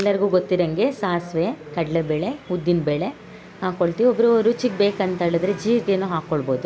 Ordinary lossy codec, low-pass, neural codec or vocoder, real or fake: none; none; none; real